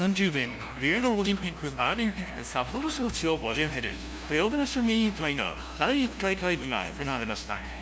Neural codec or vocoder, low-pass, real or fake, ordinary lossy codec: codec, 16 kHz, 0.5 kbps, FunCodec, trained on LibriTTS, 25 frames a second; none; fake; none